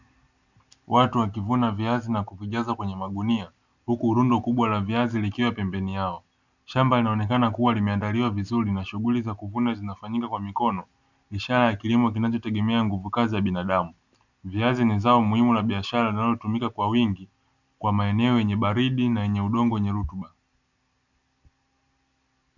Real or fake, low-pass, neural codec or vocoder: real; 7.2 kHz; none